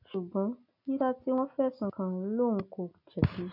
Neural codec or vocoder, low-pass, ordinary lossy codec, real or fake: none; 5.4 kHz; none; real